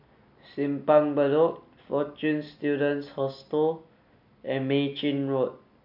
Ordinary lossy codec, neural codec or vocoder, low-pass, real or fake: MP3, 48 kbps; none; 5.4 kHz; real